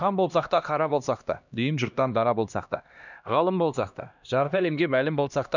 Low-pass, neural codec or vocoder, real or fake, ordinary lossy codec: 7.2 kHz; codec, 16 kHz, 1 kbps, X-Codec, HuBERT features, trained on LibriSpeech; fake; none